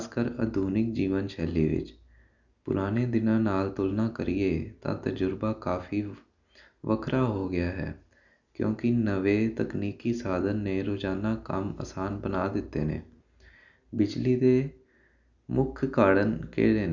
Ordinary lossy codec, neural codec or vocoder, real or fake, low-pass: none; none; real; 7.2 kHz